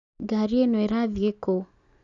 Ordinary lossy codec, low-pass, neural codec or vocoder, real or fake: Opus, 64 kbps; 7.2 kHz; none; real